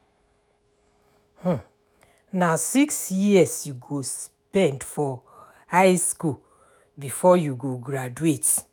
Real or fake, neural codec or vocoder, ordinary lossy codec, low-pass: fake; autoencoder, 48 kHz, 128 numbers a frame, DAC-VAE, trained on Japanese speech; none; none